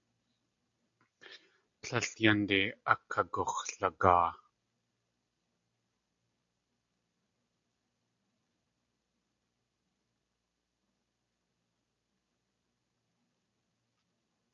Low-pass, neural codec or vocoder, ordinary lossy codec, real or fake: 7.2 kHz; none; AAC, 64 kbps; real